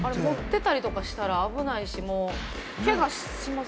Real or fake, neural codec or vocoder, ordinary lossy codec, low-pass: real; none; none; none